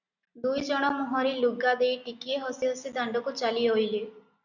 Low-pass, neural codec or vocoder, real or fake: 7.2 kHz; none; real